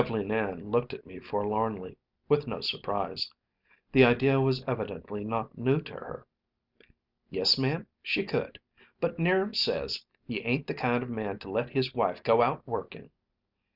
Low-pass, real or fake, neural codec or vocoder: 5.4 kHz; real; none